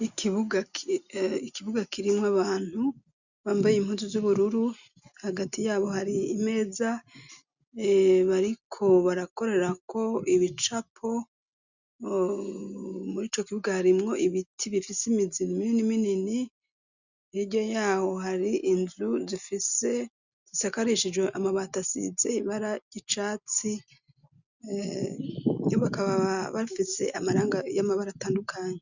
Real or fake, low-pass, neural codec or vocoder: real; 7.2 kHz; none